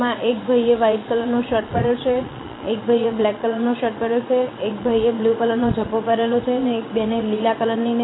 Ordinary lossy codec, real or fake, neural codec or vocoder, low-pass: AAC, 16 kbps; fake; vocoder, 22.05 kHz, 80 mel bands, Vocos; 7.2 kHz